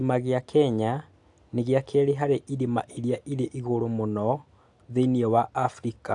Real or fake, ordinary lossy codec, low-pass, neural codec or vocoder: real; AAC, 64 kbps; 10.8 kHz; none